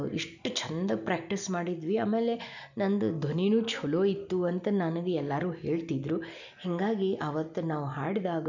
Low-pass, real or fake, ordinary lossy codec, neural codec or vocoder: 7.2 kHz; real; none; none